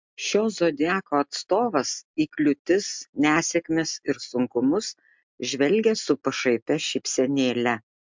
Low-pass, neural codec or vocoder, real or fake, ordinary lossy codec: 7.2 kHz; none; real; MP3, 64 kbps